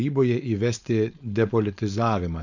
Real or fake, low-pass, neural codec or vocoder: fake; 7.2 kHz; codec, 16 kHz, 4.8 kbps, FACodec